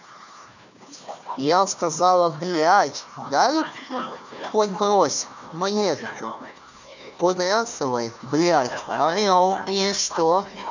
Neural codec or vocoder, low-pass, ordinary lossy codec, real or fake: codec, 16 kHz, 1 kbps, FunCodec, trained on Chinese and English, 50 frames a second; 7.2 kHz; none; fake